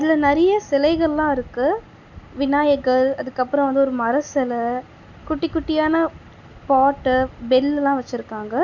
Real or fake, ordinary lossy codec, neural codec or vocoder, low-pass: real; none; none; 7.2 kHz